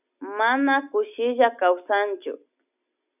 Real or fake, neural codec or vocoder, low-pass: real; none; 3.6 kHz